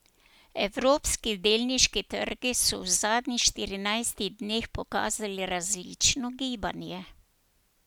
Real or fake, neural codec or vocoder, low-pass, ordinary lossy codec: real; none; none; none